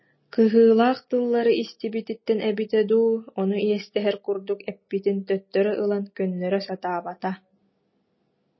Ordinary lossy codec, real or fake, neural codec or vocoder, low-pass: MP3, 24 kbps; real; none; 7.2 kHz